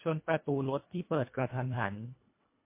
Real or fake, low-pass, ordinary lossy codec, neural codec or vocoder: fake; 3.6 kHz; MP3, 24 kbps; codec, 24 kHz, 1.5 kbps, HILCodec